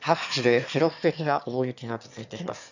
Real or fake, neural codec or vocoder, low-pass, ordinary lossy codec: fake; autoencoder, 22.05 kHz, a latent of 192 numbers a frame, VITS, trained on one speaker; 7.2 kHz; none